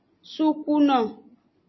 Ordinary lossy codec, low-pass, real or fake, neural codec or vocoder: MP3, 24 kbps; 7.2 kHz; real; none